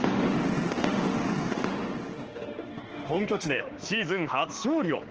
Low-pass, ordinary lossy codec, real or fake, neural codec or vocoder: 7.2 kHz; Opus, 16 kbps; fake; autoencoder, 48 kHz, 32 numbers a frame, DAC-VAE, trained on Japanese speech